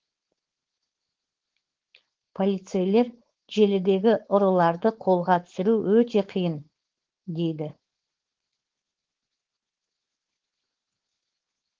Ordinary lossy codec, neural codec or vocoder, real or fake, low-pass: Opus, 16 kbps; codec, 16 kHz, 4.8 kbps, FACodec; fake; 7.2 kHz